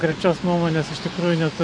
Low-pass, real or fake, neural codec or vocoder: 9.9 kHz; fake; vocoder, 24 kHz, 100 mel bands, Vocos